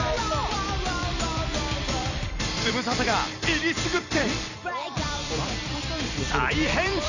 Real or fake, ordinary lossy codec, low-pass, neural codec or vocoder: fake; none; 7.2 kHz; vocoder, 44.1 kHz, 128 mel bands every 256 samples, BigVGAN v2